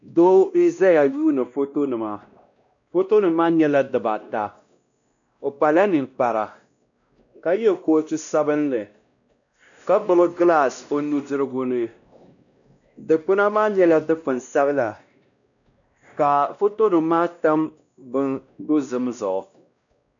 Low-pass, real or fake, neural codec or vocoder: 7.2 kHz; fake; codec, 16 kHz, 1 kbps, X-Codec, WavLM features, trained on Multilingual LibriSpeech